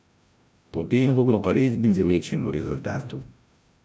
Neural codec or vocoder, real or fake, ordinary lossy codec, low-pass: codec, 16 kHz, 0.5 kbps, FreqCodec, larger model; fake; none; none